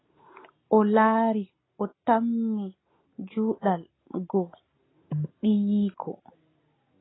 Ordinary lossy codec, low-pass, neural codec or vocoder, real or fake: AAC, 16 kbps; 7.2 kHz; none; real